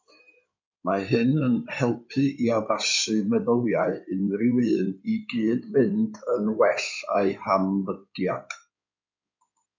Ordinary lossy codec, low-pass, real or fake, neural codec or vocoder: MP3, 64 kbps; 7.2 kHz; fake; codec, 16 kHz in and 24 kHz out, 2.2 kbps, FireRedTTS-2 codec